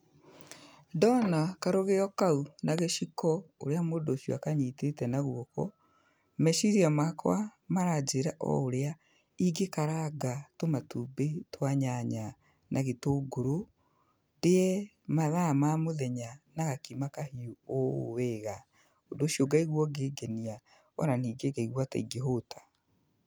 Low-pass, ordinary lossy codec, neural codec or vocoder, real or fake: none; none; none; real